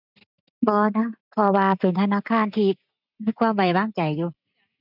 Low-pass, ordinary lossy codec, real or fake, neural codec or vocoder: 5.4 kHz; none; real; none